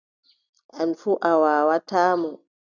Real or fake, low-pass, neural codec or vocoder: real; 7.2 kHz; none